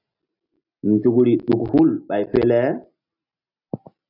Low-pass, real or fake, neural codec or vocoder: 5.4 kHz; real; none